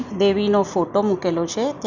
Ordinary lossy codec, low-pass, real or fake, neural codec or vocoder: none; 7.2 kHz; real; none